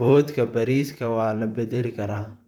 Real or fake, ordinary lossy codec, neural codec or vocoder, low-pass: fake; none; vocoder, 44.1 kHz, 128 mel bands, Pupu-Vocoder; 19.8 kHz